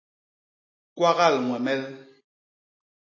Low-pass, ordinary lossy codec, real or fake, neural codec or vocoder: 7.2 kHz; AAC, 48 kbps; real; none